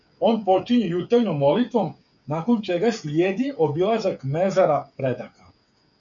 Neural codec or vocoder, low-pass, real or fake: codec, 16 kHz, 8 kbps, FreqCodec, smaller model; 7.2 kHz; fake